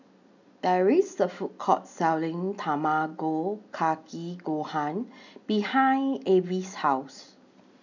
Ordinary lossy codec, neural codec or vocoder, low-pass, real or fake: none; none; 7.2 kHz; real